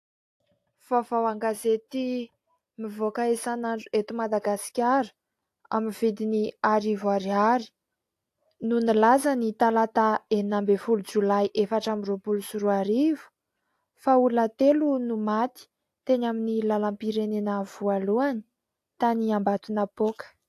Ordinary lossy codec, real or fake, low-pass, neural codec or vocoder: AAC, 64 kbps; real; 14.4 kHz; none